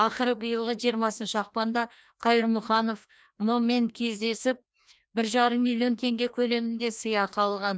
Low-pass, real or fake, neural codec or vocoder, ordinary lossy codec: none; fake; codec, 16 kHz, 1 kbps, FreqCodec, larger model; none